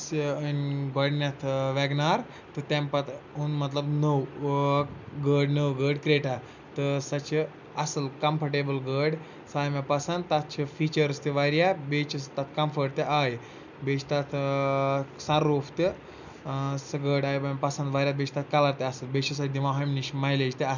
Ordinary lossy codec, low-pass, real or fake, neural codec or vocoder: none; 7.2 kHz; real; none